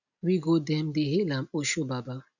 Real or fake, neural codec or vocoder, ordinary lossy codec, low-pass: real; none; none; 7.2 kHz